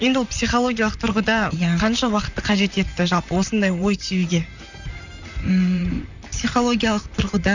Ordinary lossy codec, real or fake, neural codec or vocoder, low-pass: none; fake; vocoder, 44.1 kHz, 128 mel bands, Pupu-Vocoder; 7.2 kHz